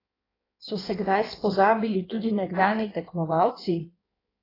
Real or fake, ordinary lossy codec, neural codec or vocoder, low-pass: fake; AAC, 24 kbps; codec, 16 kHz in and 24 kHz out, 1.1 kbps, FireRedTTS-2 codec; 5.4 kHz